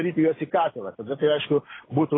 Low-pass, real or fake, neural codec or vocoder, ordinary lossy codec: 7.2 kHz; real; none; AAC, 16 kbps